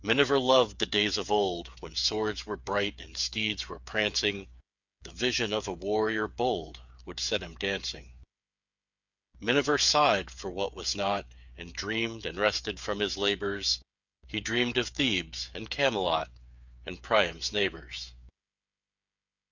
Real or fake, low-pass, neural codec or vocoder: fake; 7.2 kHz; codec, 16 kHz, 8 kbps, FreqCodec, smaller model